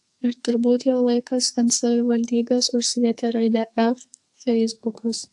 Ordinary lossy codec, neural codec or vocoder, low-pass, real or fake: AAC, 64 kbps; codec, 44.1 kHz, 2.6 kbps, SNAC; 10.8 kHz; fake